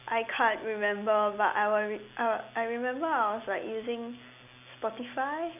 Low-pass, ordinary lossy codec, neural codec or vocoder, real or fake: 3.6 kHz; none; none; real